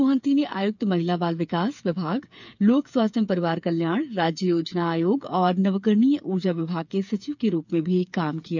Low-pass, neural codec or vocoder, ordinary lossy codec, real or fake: 7.2 kHz; codec, 16 kHz, 8 kbps, FreqCodec, smaller model; none; fake